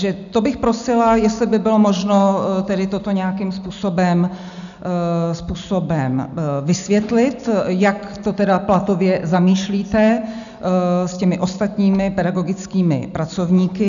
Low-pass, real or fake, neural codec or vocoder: 7.2 kHz; real; none